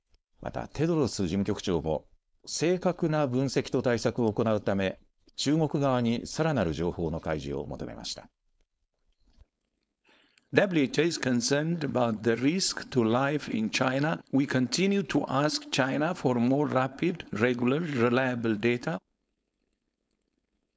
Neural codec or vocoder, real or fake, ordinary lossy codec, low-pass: codec, 16 kHz, 4.8 kbps, FACodec; fake; none; none